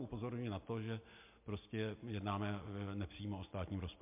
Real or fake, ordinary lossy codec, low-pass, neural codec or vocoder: real; MP3, 32 kbps; 3.6 kHz; none